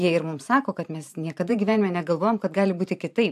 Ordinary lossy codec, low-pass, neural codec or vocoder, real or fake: AAC, 96 kbps; 14.4 kHz; vocoder, 44.1 kHz, 128 mel bands every 512 samples, BigVGAN v2; fake